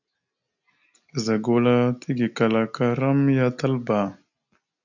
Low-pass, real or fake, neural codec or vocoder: 7.2 kHz; real; none